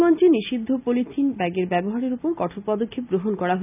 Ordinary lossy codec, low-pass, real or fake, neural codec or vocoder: none; 3.6 kHz; real; none